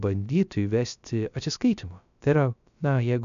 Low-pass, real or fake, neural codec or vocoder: 7.2 kHz; fake; codec, 16 kHz, 0.3 kbps, FocalCodec